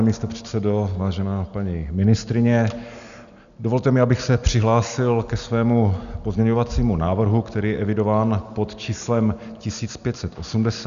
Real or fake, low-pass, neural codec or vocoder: real; 7.2 kHz; none